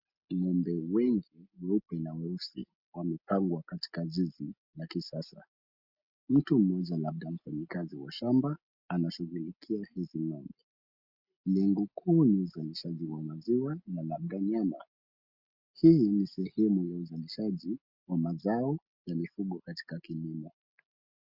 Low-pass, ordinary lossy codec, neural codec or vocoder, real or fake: 5.4 kHz; Opus, 64 kbps; none; real